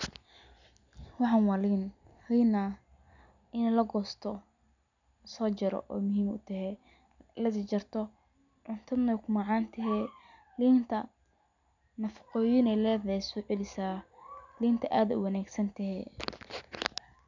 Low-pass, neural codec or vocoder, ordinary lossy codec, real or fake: 7.2 kHz; none; none; real